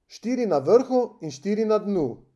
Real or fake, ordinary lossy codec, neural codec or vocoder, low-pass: real; none; none; none